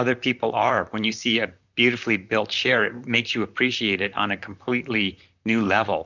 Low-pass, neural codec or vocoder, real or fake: 7.2 kHz; vocoder, 44.1 kHz, 128 mel bands, Pupu-Vocoder; fake